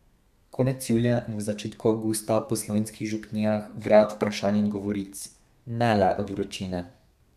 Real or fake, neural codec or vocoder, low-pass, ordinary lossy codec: fake; codec, 32 kHz, 1.9 kbps, SNAC; 14.4 kHz; none